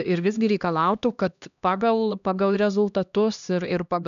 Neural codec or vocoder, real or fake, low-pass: codec, 16 kHz, 1 kbps, X-Codec, HuBERT features, trained on LibriSpeech; fake; 7.2 kHz